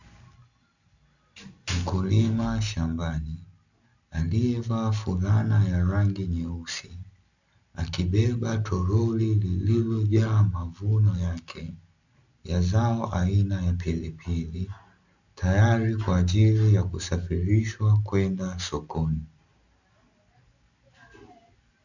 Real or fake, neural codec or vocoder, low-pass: real; none; 7.2 kHz